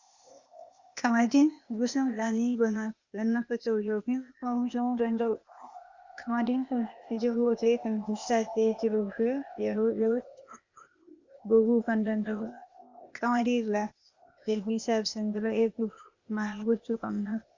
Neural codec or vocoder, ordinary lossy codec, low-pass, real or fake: codec, 16 kHz, 0.8 kbps, ZipCodec; Opus, 64 kbps; 7.2 kHz; fake